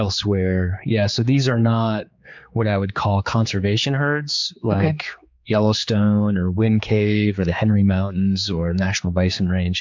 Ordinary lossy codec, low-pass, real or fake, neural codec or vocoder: MP3, 64 kbps; 7.2 kHz; fake; codec, 16 kHz, 4 kbps, X-Codec, HuBERT features, trained on general audio